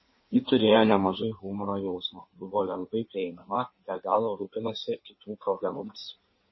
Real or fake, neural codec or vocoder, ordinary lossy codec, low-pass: fake; codec, 16 kHz in and 24 kHz out, 1.1 kbps, FireRedTTS-2 codec; MP3, 24 kbps; 7.2 kHz